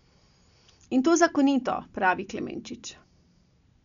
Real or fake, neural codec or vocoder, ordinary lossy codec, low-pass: real; none; none; 7.2 kHz